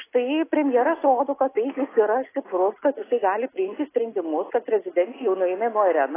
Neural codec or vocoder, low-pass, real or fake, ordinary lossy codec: none; 3.6 kHz; real; AAC, 16 kbps